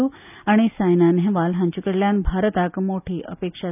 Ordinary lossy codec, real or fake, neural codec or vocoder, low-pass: none; real; none; 3.6 kHz